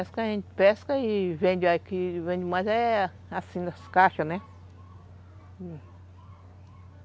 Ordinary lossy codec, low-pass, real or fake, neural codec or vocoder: none; none; real; none